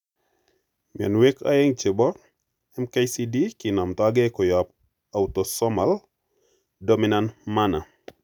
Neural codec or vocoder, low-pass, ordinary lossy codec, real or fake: none; 19.8 kHz; none; real